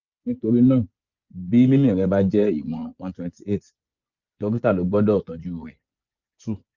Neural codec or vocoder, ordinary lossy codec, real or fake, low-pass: vocoder, 24 kHz, 100 mel bands, Vocos; Opus, 64 kbps; fake; 7.2 kHz